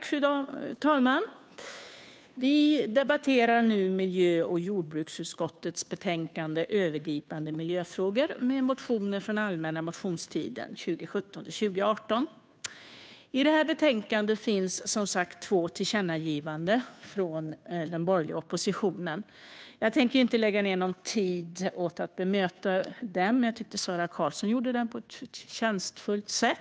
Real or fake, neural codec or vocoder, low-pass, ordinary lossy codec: fake; codec, 16 kHz, 2 kbps, FunCodec, trained on Chinese and English, 25 frames a second; none; none